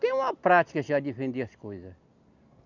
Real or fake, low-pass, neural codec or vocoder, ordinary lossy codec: real; 7.2 kHz; none; none